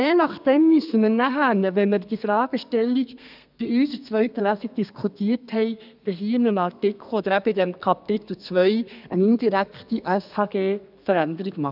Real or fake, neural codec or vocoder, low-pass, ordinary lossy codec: fake; codec, 32 kHz, 1.9 kbps, SNAC; 5.4 kHz; none